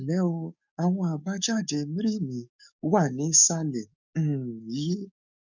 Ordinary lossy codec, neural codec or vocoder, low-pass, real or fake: none; codec, 44.1 kHz, 7.8 kbps, DAC; 7.2 kHz; fake